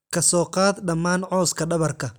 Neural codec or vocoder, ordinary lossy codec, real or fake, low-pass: none; none; real; none